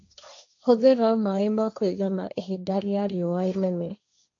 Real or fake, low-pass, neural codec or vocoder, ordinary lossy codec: fake; 7.2 kHz; codec, 16 kHz, 1.1 kbps, Voila-Tokenizer; AAC, 48 kbps